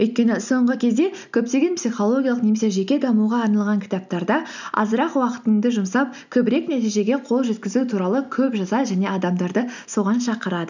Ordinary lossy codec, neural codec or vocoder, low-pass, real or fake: none; none; 7.2 kHz; real